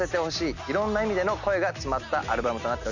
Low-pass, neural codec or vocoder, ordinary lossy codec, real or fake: 7.2 kHz; none; none; real